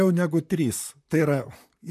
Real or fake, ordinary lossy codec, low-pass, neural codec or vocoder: fake; MP3, 96 kbps; 14.4 kHz; vocoder, 44.1 kHz, 128 mel bands, Pupu-Vocoder